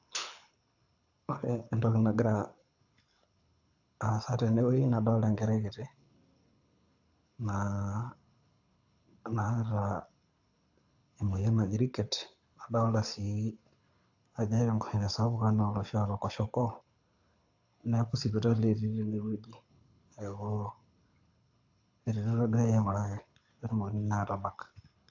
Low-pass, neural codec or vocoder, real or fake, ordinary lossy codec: 7.2 kHz; codec, 24 kHz, 6 kbps, HILCodec; fake; AAC, 48 kbps